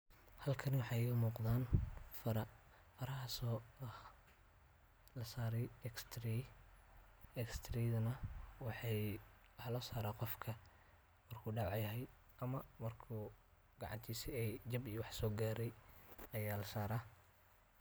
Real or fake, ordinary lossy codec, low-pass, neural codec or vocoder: real; none; none; none